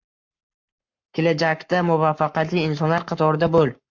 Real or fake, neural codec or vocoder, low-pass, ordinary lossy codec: fake; codec, 44.1 kHz, 7.8 kbps, Pupu-Codec; 7.2 kHz; MP3, 64 kbps